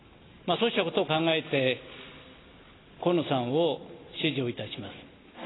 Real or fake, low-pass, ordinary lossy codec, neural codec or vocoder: real; 7.2 kHz; AAC, 16 kbps; none